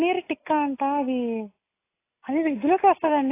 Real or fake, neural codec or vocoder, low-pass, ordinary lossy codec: real; none; 3.6 kHz; AAC, 24 kbps